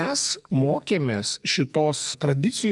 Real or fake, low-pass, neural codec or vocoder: fake; 10.8 kHz; codec, 44.1 kHz, 2.6 kbps, SNAC